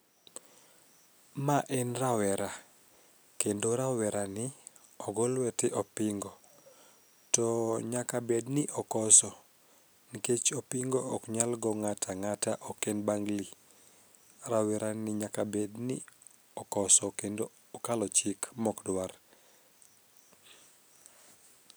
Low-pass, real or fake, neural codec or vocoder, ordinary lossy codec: none; real; none; none